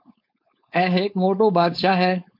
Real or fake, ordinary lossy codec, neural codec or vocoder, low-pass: fake; MP3, 48 kbps; codec, 16 kHz, 4.8 kbps, FACodec; 5.4 kHz